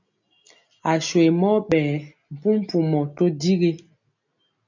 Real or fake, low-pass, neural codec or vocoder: real; 7.2 kHz; none